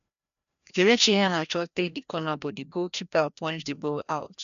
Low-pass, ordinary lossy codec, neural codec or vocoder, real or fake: 7.2 kHz; none; codec, 16 kHz, 1 kbps, FreqCodec, larger model; fake